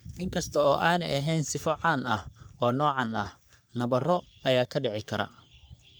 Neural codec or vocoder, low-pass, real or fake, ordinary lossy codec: codec, 44.1 kHz, 3.4 kbps, Pupu-Codec; none; fake; none